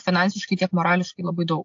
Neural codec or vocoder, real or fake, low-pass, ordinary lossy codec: none; real; 7.2 kHz; AAC, 48 kbps